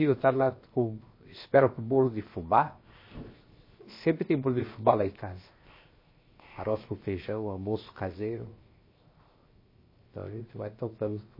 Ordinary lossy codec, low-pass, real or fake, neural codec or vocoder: MP3, 24 kbps; 5.4 kHz; fake; codec, 16 kHz, 0.7 kbps, FocalCodec